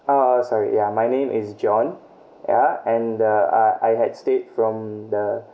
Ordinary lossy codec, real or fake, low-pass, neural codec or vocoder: none; real; none; none